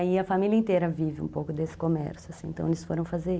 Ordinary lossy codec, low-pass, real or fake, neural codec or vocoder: none; none; real; none